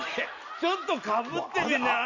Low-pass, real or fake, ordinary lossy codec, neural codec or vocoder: 7.2 kHz; real; none; none